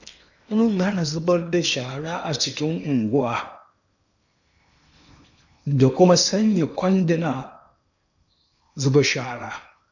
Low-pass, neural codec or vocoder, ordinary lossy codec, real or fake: 7.2 kHz; codec, 16 kHz in and 24 kHz out, 0.8 kbps, FocalCodec, streaming, 65536 codes; none; fake